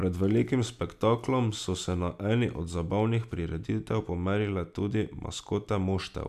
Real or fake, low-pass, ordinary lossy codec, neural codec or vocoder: real; 14.4 kHz; none; none